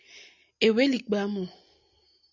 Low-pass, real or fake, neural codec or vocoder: 7.2 kHz; real; none